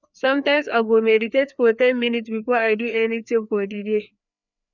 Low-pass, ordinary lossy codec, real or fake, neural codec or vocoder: 7.2 kHz; none; fake; codec, 16 kHz, 2 kbps, FreqCodec, larger model